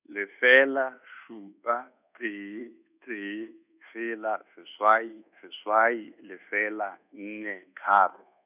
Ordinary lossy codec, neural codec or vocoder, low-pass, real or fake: none; codec, 24 kHz, 1.2 kbps, DualCodec; 3.6 kHz; fake